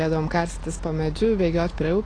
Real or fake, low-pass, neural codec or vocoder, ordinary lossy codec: real; 9.9 kHz; none; AAC, 32 kbps